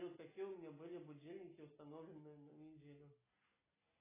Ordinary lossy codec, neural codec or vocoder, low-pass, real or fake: AAC, 16 kbps; none; 3.6 kHz; real